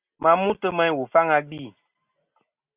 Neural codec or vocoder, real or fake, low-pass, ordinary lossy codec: none; real; 3.6 kHz; Opus, 64 kbps